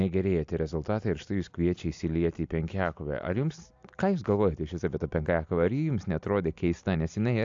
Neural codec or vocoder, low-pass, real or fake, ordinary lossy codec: none; 7.2 kHz; real; AAC, 64 kbps